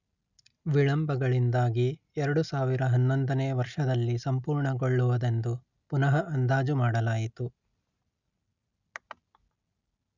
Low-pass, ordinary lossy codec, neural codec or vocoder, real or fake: 7.2 kHz; none; none; real